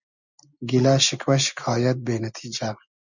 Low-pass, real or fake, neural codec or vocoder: 7.2 kHz; real; none